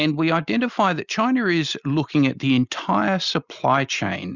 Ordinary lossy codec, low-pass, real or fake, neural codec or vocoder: Opus, 64 kbps; 7.2 kHz; real; none